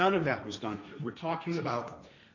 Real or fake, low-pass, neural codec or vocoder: fake; 7.2 kHz; codec, 16 kHz, 1.1 kbps, Voila-Tokenizer